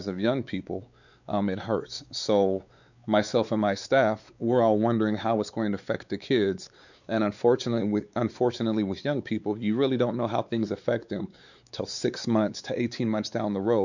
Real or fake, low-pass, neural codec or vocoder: fake; 7.2 kHz; codec, 16 kHz, 4 kbps, X-Codec, WavLM features, trained on Multilingual LibriSpeech